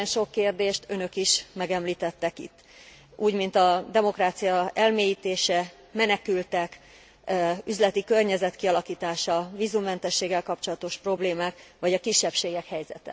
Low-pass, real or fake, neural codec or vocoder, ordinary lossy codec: none; real; none; none